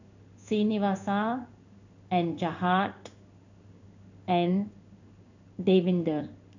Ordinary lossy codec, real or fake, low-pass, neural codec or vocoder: none; fake; 7.2 kHz; codec, 16 kHz in and 24 kHz out, 1 kbps, XY-Tokenizer